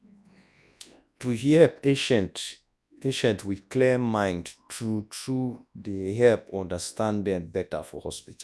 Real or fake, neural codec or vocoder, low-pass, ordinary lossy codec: fake; codec, 24 kHz, 0.9 kbps, WavTokenizer, large speech release; none; none